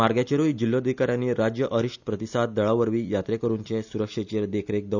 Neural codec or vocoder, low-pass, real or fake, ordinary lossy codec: none; 7.2 kHz; real; none